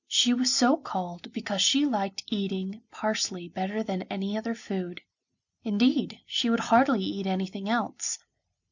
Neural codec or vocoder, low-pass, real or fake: none; 7.2 kHz; real